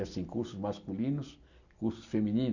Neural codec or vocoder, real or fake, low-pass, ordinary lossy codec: none; real; 7.2 kHz; none